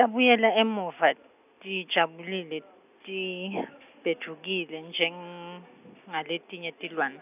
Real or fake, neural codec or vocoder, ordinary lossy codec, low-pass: real; none; none; 3.6 kHz